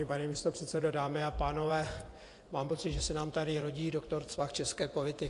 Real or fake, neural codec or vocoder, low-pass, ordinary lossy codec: fake; vocoder, 24 kHz, 100 mel bands, Vocos; 10.8 kHz; AAC, 48 kbps